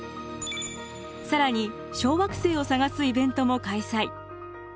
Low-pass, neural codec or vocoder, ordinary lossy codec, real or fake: none; none; none; real